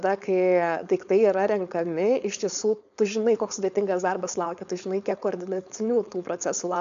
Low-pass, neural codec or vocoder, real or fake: 7.2 kHz; codec, 16 kHz, 4.8 kbps, FACodec; fake